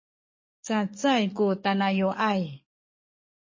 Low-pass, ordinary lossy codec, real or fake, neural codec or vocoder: 7.2 kHz; MP3, 32 kbps; fake; codec, 44.1 kHz, 7.8 kbps, DAC